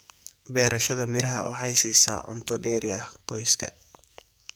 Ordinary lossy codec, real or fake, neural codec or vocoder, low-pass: none; fake; codec, 44.1 kHz, 2.6 kbps, SNAC; none